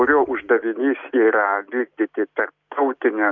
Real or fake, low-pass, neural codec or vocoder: real; 7.2 kHz; none